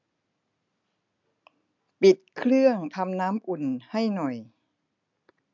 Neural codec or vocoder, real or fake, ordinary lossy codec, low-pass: none; real; MP3, 64 kbps; 7.2 kHz